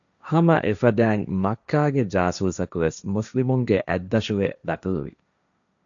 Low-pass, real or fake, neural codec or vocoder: 7.2 kHz; fake; codec, 16 kHz, 1.1 kbps, Voila-Tokenizer